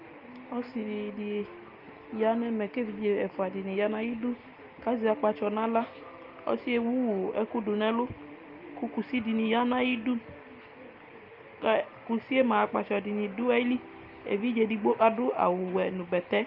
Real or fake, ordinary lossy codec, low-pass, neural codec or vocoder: real; Opus, 32 kbps; 5.4 kHz; none